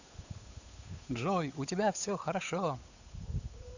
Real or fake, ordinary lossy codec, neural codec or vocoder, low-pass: fake; none; codec, 16 kHz, 8 kbps, FunCodec, trained on Chinese and English, 25 frames a second; 7.2 kHz